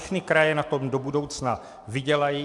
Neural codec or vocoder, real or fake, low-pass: none; real; 10.8 kHz